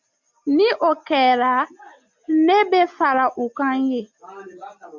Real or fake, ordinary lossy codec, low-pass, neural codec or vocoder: real; MP3, 64 kbps; 7.2 kHz; none